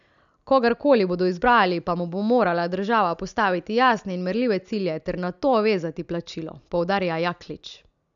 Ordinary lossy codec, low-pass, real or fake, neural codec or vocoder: none; 7.2 kHz; real; none